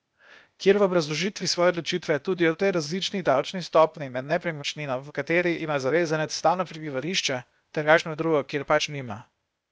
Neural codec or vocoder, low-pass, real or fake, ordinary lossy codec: codec, 16 kHz, 0.8 kbps, ZipCodec; none; fake; none